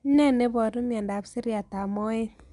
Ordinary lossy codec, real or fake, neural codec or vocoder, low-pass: none; real; none; 10.8 kHz